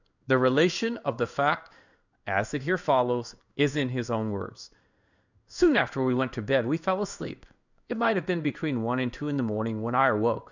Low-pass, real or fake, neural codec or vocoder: 7.2 kHz; fake; codec, 16 kHz in and 24 kHz out, 1 kbps, XY-Tokenizer